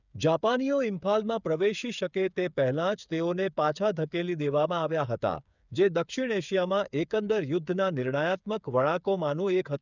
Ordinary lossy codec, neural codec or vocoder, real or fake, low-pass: none; codec, 16 kHz, 8 kbps, FreqCodec, smaller model; fake; 7.2 kHz